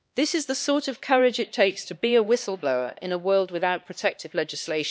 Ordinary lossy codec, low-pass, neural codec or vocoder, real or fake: none; none; codec, 16 kHz, 2 kbps, X-Codec, HuBERT features, trained on LibriSpeech; fake